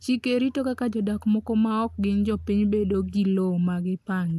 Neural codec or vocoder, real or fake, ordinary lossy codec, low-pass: none; real; AAC, 96 kbps; 14.4 kHz